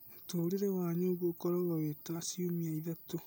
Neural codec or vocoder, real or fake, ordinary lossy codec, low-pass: none; real; none; none